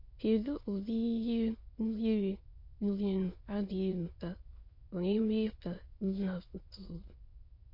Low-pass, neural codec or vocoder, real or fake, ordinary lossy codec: 5.4 kHz; autoencoder, 22.05 kHz, a latent of 192 numbers a frame, VITS, trained on many speakers; fake; MP3, 32 kbps